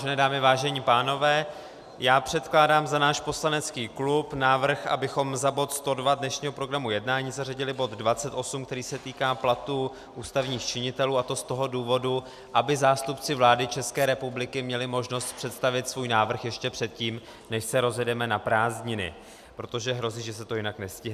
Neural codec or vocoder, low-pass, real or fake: none; 14.4 kHz; real